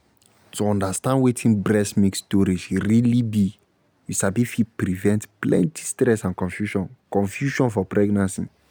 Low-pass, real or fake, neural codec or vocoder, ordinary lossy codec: none; real; none; none